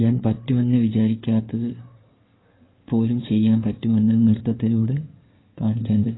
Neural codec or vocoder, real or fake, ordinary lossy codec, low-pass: codec, 16 kHz in and 24 kHz out, 2.2 kbps, FireRedTTS-2 codec; fake; AAC, 16 kbps; 7.2 kHz